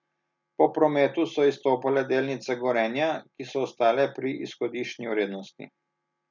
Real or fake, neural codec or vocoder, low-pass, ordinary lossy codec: real; none; 7.2 kHz; none